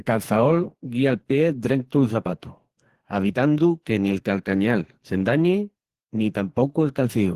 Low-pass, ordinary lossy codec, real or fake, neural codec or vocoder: 14.4 kHz; Opus, 16 kbps; fake; codec, 44.1 kHz, 2.6 kbps, SNAC